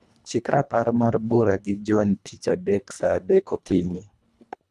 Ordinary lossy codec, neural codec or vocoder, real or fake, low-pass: none; codec, 24 kHz, 1.5 kbps, HILCodec; fake; none